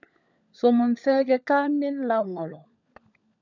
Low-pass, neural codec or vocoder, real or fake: 7.2 kHz; codec, 16 kHz, 16 kbps, FunCodec, trained on LibriTTS, 50 frames a second; fake